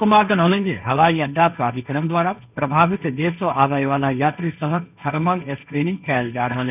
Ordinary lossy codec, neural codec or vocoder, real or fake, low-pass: none; codec, 16 kHz, 1.1 kbps, Voila-Tokenizer; fake; 3.6 kHz